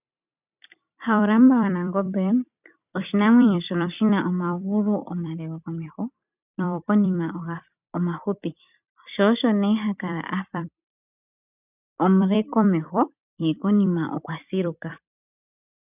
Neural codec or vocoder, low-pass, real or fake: vocoder, 44.1 kHz, 128 mel bands every 256 samples, BigVGAN v2; 3.6 kHz; fake